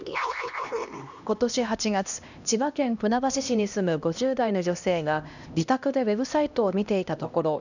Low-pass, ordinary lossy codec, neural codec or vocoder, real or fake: 7.2 kHz; none; codec, 16 kHz, 1 kbps, X-Codec, HuBERT features, trained on LibriSpeech; fake